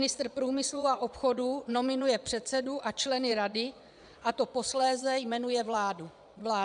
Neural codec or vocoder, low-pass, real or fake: vocoder, 22.05 kHz, 80 mel bands, Vocos; 9.9 kHz; fake